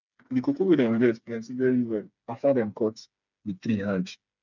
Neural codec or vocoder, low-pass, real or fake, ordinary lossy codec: codec, 16 kHz, 4 kbps, FreqCodec, smaller model; 7.2 kHz; fake; none